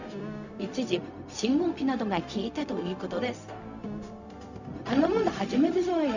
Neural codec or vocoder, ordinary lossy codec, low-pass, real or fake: codec, 16 kHz, 0.4 kbps, LongCat-Audio-Codec; none; 7.2 kHz; fake